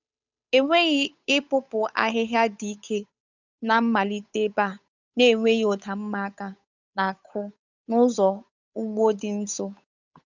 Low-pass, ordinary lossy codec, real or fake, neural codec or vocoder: 7.2 kHz; none; fake; codec, 16 kHz, 8 kbps, FunCodec, trained on Chinese and English, 25 frames a second